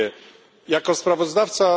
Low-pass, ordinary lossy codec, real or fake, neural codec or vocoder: none; none; real; none